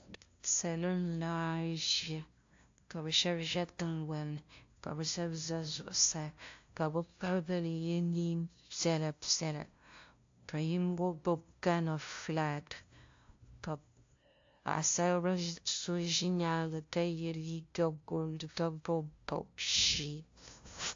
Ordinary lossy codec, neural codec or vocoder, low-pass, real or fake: AAC, 48 kbps; codec, 16 kHz, 0.5 kbps, FunCodec, trained on LibriTTS, 25 frames a second; 7.2 kHz; fake